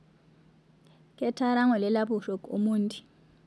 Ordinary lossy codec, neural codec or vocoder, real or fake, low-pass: none; none; real; none